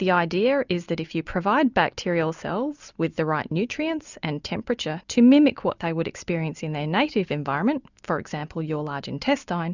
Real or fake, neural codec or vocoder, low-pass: real; none; 7.2 kHz